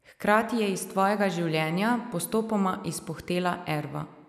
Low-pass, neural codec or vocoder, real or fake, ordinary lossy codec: 14.4 kHz; none; real; none